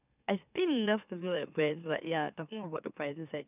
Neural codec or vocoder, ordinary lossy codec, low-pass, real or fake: autoencoder, 44.1 kHz, a latent of 192 numbers a frame, MeloTTS; none; 3.6 kHz; fake